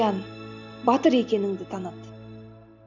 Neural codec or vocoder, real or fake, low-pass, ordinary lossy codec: none; real; 7.2 kHz; none